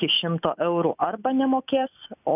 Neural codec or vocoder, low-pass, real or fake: none; 3.6 kHz; real